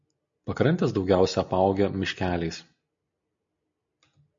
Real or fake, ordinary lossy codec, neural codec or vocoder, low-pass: real; MP3, 48 kbps; none; 7.2 kHz